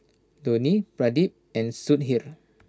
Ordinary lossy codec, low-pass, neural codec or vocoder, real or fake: none; none; none; real